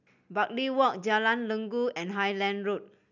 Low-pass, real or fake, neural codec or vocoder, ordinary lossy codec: 7.2 kHz; real; none; none